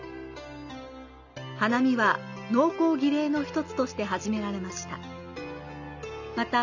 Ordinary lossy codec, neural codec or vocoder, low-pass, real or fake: none; none; 7.2 kHz; real